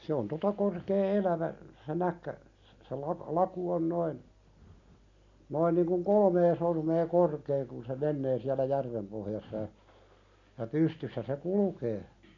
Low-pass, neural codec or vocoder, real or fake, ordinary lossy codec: 7.2 kHz; none; real; none